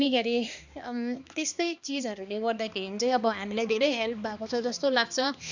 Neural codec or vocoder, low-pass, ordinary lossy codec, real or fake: codec, 16 kHz, 2 kbps, X-Codec, HuBERT features, trained on balanced general audio; 7.2 kHz; none; fake